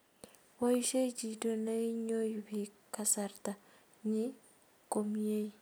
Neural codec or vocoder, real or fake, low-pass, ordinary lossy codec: none; real; none; none